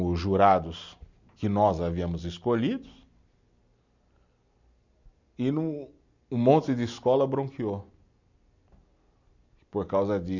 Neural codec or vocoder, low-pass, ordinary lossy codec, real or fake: none; 7.2 kHz; none; real